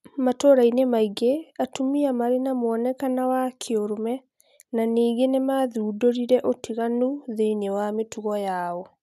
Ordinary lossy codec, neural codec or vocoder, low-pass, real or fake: none; none; 14.4 kHz; real